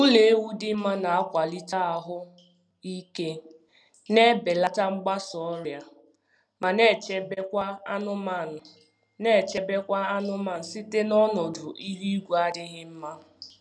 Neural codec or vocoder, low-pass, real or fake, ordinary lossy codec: none; none; real; none